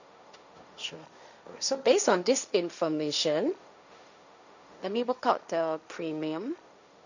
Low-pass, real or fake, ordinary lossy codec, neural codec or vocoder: 7.2 kHz; fake; none; codec, 16 kHz, 1.1 kbps, Voila-Tokenizer